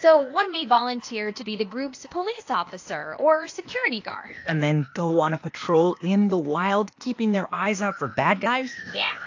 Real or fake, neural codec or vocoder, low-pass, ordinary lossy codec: fake; codec, 16 kHz, 0.8 kbps, ZipCodec; 7.2 kHz; AAC, 48 kbps